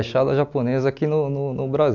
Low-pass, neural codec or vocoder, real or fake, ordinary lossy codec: 7.2 kHz; none; real; none